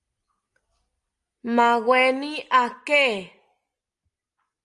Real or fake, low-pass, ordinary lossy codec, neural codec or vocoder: real; 10.8 kHz; Opus, 32 kbps; none